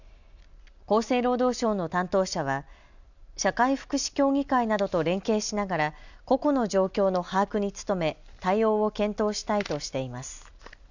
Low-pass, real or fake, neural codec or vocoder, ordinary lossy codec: 7.2 kHz; real; none; none